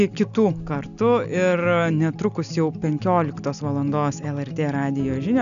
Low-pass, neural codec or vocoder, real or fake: 7.2 kHz; none; real